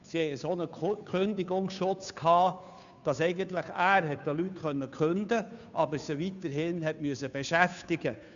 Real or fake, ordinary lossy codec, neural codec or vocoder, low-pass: fake; none; codec, 16 kHz, 2 kbps, FunCodec, trained on Chinese and English, 25 frames a second; 7.2 kHz